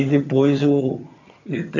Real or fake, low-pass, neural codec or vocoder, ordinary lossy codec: fake; 7.2 kHz; vocoder, 22.05 kHz, 80 mel bands, HiFi-GAN; none